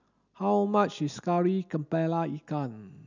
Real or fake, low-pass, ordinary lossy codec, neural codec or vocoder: real; 7.2 kHz; none; none